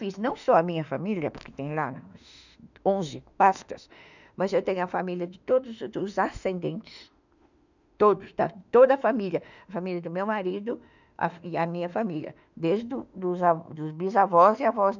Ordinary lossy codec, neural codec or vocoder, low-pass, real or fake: none; autoencoder, 48 kHz, 32 numbers a frame, DAC-VAE, trained on Japanese speech; 7.2 kHz; fake